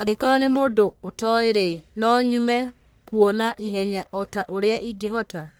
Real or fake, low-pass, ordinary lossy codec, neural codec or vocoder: fake; none; none; codec, 44.1 kHz, 1.7 kbps, Pupu-Codec